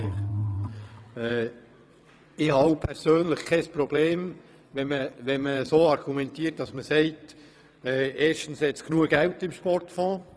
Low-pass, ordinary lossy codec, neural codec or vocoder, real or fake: none; none; vocoder, 22.05 kHz, 80 mel bands, WaveNeXt; fake